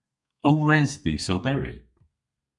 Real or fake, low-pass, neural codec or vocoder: fake; 10.8 kHz; codec, 32 kHz, 1.9 kbps, SNAC